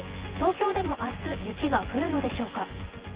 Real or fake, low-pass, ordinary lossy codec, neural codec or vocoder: fake; 3.6 kHz; Opus, 16 kbps; vocoder, 24 kHz, 100 mel bands, Vocos